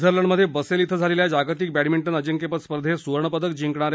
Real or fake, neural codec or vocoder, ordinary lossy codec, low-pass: real; none; none; none